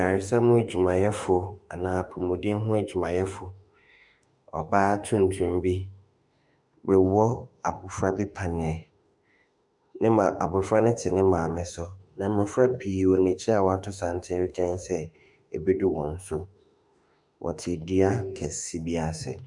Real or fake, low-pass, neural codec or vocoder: fake; 10.8 kHz; autoencoder, 48 kHz, 32 numbers a frame, DAC-VAE, trained on Japanese speech